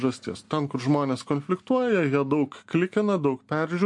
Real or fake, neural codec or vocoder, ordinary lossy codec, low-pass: real; none; MP3, 48 kbps; 10.8 kHz